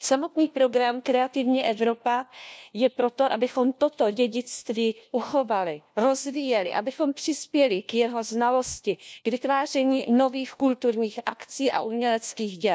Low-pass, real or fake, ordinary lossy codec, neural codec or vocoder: none; fake; none; codec, 16 kHz, 1 kbps, FunCodec, trained on LibriTTS, 50 frames a second